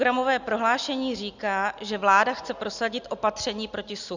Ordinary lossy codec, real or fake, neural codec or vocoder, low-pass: Opus, 64 kbps; real; none; 7.2 kHz